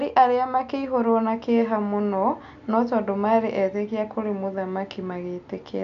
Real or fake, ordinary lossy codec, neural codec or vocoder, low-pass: real; none; none; 7.2 kHz